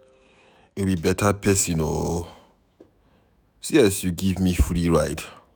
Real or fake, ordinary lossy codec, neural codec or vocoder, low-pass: fake; none; autoencoder, 48 kHz, 128 numbers a frame, DAC-VAE, trained on Japanese speech; none